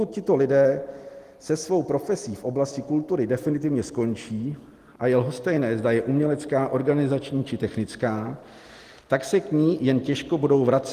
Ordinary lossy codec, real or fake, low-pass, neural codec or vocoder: Opus, 24 kbps; real; 14.4 kHz; none